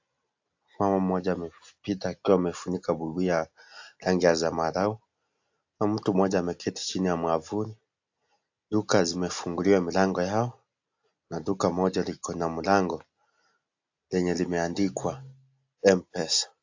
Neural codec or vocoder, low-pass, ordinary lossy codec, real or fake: none; 7.2 kHz; AAC, 48 kbps; real